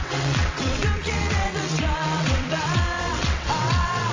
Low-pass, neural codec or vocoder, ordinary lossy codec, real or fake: 7.2 kHz; vocoder, 22.05 kHz, 80 mel bands, WaveNeXt; AAC, 48 kbps; fake